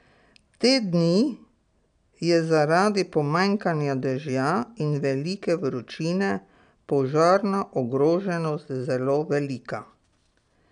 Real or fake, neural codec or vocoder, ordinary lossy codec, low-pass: real; none; MP3, 96 kbps; 9.9 kHz